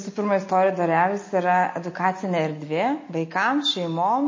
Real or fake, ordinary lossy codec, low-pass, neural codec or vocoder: real; MP3, 32 kbps; 7.2 kHz; none